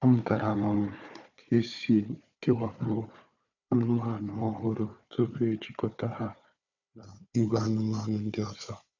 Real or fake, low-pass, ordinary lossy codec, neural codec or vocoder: fake; 7.2 kHz; none; codec, 16 kHz, 4 kbps, FunCodec, trained on Chinese and English, 50 frames a second